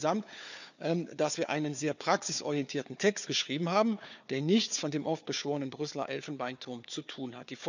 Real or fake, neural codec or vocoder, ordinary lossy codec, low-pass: fake; codec, 16 kHz, 4 kbps, FunCodec, trained on Chinese and English, 50 frames a second; none; 7.2 kHz